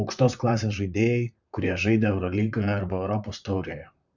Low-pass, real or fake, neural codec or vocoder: 7.2 kHz; fake; vocoder, 44.1 kHz, 128 mel bands, Pupu-Vocoder